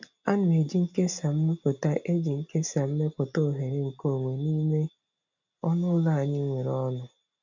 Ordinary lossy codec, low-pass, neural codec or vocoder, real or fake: none; 7.2 kHz; none; real